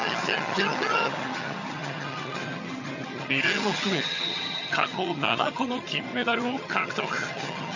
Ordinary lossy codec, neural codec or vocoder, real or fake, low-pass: none; vocoder, 22.05 kHz, 80 mel bands, HiFi-GAN; fake; 7.2 kHz